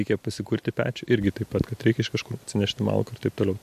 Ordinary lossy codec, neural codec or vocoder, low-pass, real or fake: MP3, 64 kbps; none; 14.4 kHz; real